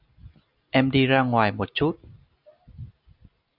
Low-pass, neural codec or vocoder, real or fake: 5.4 kHz; none; real